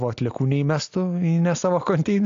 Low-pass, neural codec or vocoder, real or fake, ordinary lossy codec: 7.2 kHz; none; real; AAC, 64 kbps